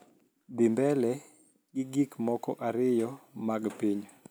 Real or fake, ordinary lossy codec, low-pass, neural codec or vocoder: real; none; none; none